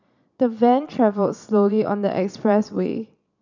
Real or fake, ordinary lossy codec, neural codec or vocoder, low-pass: fake; none; vocoder, 22.05 kHz, 80 mel bands, Vocos; 7.2 kHz